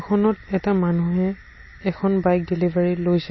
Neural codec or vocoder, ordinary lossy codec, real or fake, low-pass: none; MP3, 24 kbps; real; 7.2 kHz